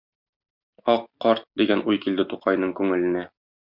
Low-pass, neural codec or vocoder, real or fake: 5.4 kHz; none; real